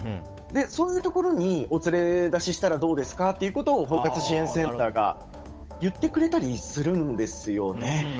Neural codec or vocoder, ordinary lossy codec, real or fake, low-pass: codec, 16 kHz, 8 kbps, FunCodec, trained on Chinese and English, 25 frames a second; none; fake; none